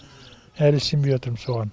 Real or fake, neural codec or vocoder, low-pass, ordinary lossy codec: real; none; none; none